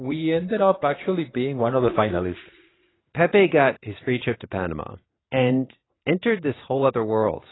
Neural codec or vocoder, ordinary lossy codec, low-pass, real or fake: vocoder, 44.1 kHz, 80 mel bands, Vocos; AAC, 16 kbps; 7.2 kHz; fake